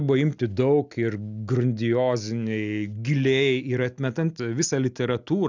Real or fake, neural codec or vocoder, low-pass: real; none; 7.2 kHz